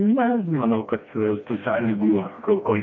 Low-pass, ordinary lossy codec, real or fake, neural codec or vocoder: 7.2 kHz; AAC, 48 kbps; fake; codec, 16 kHz, 1 kbps, FreqCodec, smaller model